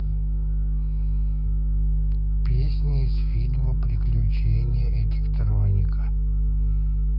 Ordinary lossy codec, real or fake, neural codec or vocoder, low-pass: none; fake; codec, 16 kHz, 6 kbps, DAC; 5.4 kHz